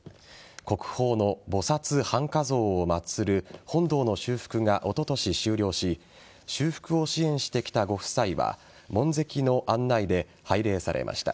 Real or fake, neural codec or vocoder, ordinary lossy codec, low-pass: real; none; none; none